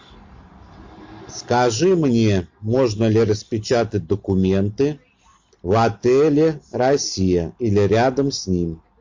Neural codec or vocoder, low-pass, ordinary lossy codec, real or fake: none; 7.2 kHz; MP3, 48 kbps; real